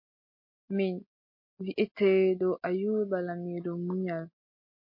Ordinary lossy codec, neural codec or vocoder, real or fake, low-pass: MP3, 32 kbps; none; real; 5.4 kHz